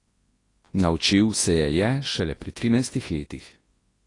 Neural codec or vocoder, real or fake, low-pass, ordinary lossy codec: codec, 24 kHz, 0.9 kbps, WavTokenizer, large speech release; fake; 10.8 kHz; AAC, 32 kbps